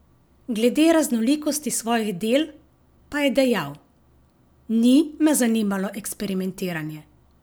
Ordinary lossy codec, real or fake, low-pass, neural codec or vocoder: none; real; none; none